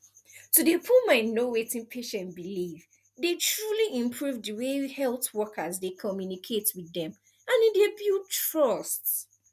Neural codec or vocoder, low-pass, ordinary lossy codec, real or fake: none; 14.4 kHz; none; real